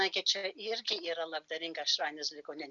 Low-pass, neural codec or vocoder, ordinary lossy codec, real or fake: 7.2 kHz; none; MP3, 96 kbps; real